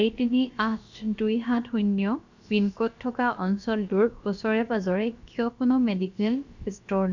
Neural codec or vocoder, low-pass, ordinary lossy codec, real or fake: codec, 16 kHz, about 1 kbps, DyCAST, with the encoder's durations; 7.2 kHz; none; fake